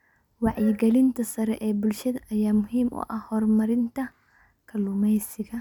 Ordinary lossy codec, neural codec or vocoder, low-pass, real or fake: none; none; 19.8 kHz; real